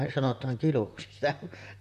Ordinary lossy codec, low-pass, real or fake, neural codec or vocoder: none; 14.4 kHz; fake; vocoder, 44.1 kHz, 128 mel bands, Pupu-Vocoder